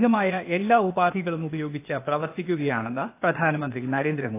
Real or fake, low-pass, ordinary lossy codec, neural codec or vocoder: fake; 3.6 kHz; AAC, 24 kbps; codec, 16 kHz, 0.8 kbps, ZipCodec